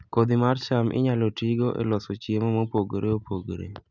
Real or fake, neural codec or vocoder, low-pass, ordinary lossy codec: real; none; 7.2 kHz; none